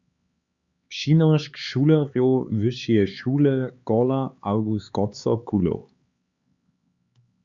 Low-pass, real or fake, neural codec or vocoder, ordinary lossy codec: 7.2 kHz; fake; codec, 16 kHz, 4 kbps, X-Codec, HuBERT features, trained on LibriSpeech; Opus, 64 kbps